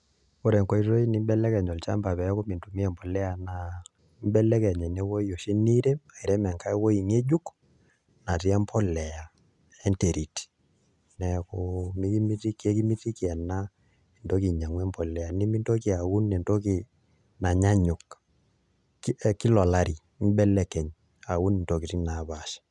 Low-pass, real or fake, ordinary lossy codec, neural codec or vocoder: 10.8 kHz; real; none; none